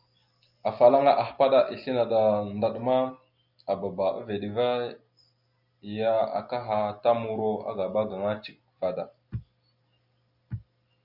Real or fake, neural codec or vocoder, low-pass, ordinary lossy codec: real; none; 5.4 kHz; Opus, 64 kbps